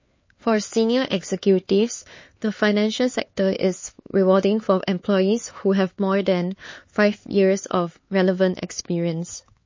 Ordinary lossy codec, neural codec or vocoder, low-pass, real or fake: MP3, 32 kbps; codec, 16 kHz, 4 kbps, X-Codec, HuBERT features, trained on LibriSpeech; 7.2 kHz; fake